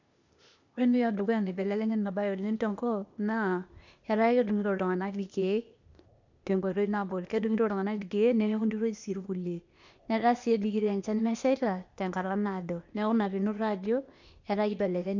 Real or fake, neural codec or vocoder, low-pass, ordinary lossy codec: fake; codec, 16 kHz, 0.8 kbps, ZipCodec; 7.2 kHz; none